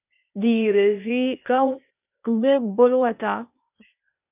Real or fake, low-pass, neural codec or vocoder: fake; 3.6 kHz; codec, 16 kHz, 0.8 kbps, ZipCodec